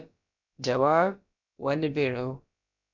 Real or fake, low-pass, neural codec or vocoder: fake; 7.2 kHz; codec, 16 kHz, about 1 kbps, DyCAST, with the encoder's durations